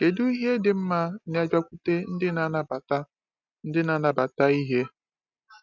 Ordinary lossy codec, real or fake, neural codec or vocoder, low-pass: none; real; none; 7.2 kHz